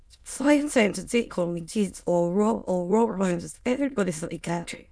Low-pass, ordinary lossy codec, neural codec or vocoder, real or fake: none; none; autoencoder, 22.05 kHz, a latent of 192 numbers a frame, VITS, trained on many speakers; fake